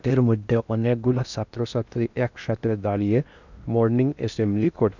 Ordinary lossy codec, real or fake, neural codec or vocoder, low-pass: none; fake; codec, 16 kHz in and 24 kHz out, 0.6 kbps, FocalCodec, streaming, 2048 codes; 7.2 kHz